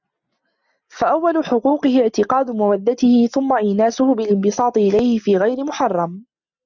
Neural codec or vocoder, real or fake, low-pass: none; real; 7.2 kHz